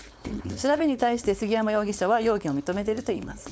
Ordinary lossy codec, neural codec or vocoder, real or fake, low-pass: none; codec, 16 kHz, 4.8 kbps, FACodec; fake; none